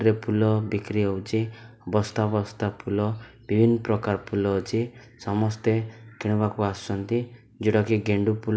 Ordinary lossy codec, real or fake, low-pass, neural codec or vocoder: none; real; none; none